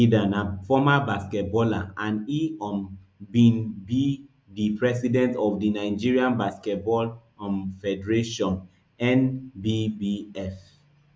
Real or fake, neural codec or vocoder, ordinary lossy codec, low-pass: real; none; none; none